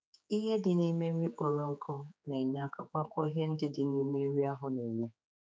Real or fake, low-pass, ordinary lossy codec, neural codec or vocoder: fake; none; none; codec, 16 kHz, 4 kbps, X-Codec, HuBERT features, trained on general audio